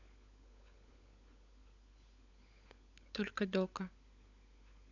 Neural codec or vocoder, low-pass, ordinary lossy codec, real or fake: codec, 16 kHz, 16 kbps, FunCodec, trained on LibriTTS, 50 frames a second; 7.2 kHz; none; fake